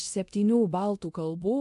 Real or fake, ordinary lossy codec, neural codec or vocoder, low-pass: fake; AAC, 64 kbps; codec, 24 kHz, 0.9 kbps, DualCodec; 10.8 kHz